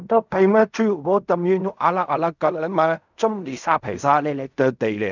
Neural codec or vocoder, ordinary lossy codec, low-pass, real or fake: codec, 16 kHz in and 24 kHz out, 0.4 kbps, LongCat-Audio-Codec, fine tuned four codebook decoder; none; 7.2 kHz; fake